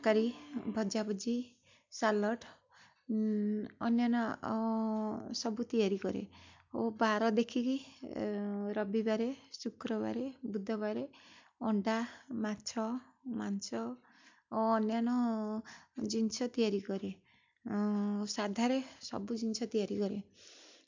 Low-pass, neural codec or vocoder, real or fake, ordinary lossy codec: 7.2 kHz; none; real; MP3, 64 kbps